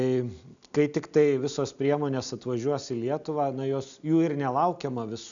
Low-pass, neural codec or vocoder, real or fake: 7.2 kHz; none; real